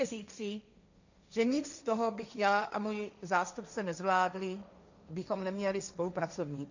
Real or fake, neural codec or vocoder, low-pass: fake; codec, 16 kHz, 1.1 kbps, Voila-Tokenizer; 7.2 kHz